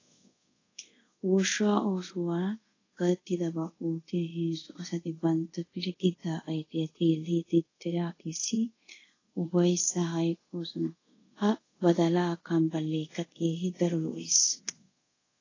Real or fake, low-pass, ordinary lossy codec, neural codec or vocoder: fake; 7.2 kHz; AAC, 32 kbps; codec, 24 kHz, 0.5 kbps, DualCodec